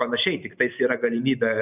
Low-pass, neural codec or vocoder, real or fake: 3.6 kHz; none; real